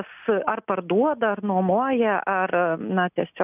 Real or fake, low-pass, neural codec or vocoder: real; 3.6 kHz; none